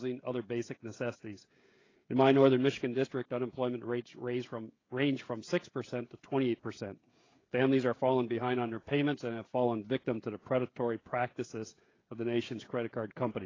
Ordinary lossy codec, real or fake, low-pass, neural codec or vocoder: AAC, 32 kbps; fake; 7.2 kHz; codec, 16 kHz, 16 kbps, FreqCodec, smaller model